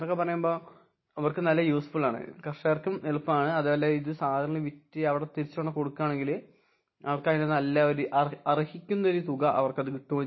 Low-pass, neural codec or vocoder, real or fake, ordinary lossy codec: 7.2 kHz; none; real; MP3, 24 kbps